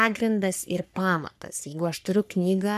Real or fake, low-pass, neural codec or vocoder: fake; 14.4 kHz; codec, 44.1 kHz, 3.4 kbps, Pupu-Codec